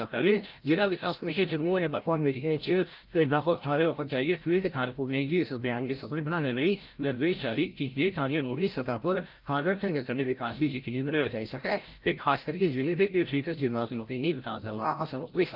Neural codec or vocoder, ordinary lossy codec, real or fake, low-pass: codec, 16 kHz, 0.5 kbps, FreqCodec, larger model; Opus, 32 kbps; fake; 5.4 kHz